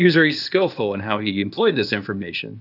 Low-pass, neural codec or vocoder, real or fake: 5.4 kHz; codec, 16 kHz, 0.8 kbps, ZipCodec; fake